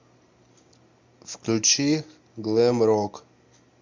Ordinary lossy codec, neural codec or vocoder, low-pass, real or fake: MP3, 64 kbps; none; 7.2 kHz; real